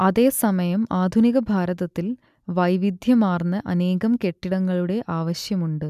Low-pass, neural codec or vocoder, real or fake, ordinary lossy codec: 14.4 kHz; none; real; none